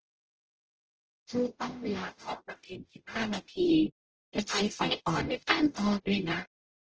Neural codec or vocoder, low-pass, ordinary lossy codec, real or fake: codec, 44.1 kHz, 0.9 kbps, DAC; 7.2 kHz; Opus, 16 kbps; fake